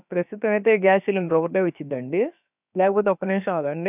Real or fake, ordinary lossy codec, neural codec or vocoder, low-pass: fake; none; codec, 16 kHz, about 1 kbps, DyCAST, with the encoder's durations; 3.6 kHz